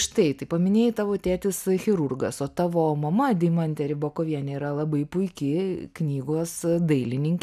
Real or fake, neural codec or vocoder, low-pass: real; none; 14.4 kHz